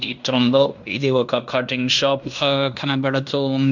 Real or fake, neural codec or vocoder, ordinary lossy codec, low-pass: fake; codec, 16 kHz in and 24 kHz out, 0.9 kbps, LongCat-Audio-Codec, fine tuned four codebook decoder; none; 7.2 kHz